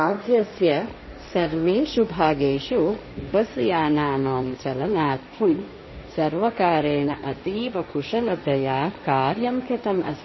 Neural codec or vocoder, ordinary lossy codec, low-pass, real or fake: codec, 16 kHz, 1.1 kbps, Voila-Tokenizer; MP3, 24 kbps; 7.2 kHz; fake